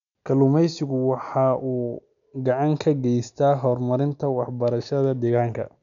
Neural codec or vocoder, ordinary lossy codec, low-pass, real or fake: none; none; 7.2 kHz; real